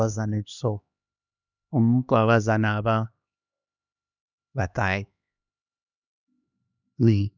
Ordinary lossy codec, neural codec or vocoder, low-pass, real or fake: none; codec, 16 kHz, 2 kbps, X-Codec, HuBERT features, trained on LibriSpeech; 7.2 kHz; fake